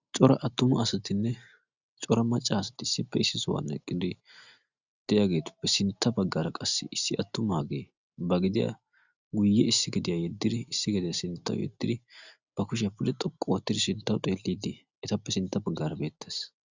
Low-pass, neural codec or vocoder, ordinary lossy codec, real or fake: 7.2 kHz; none; Opus, 64 kbps; real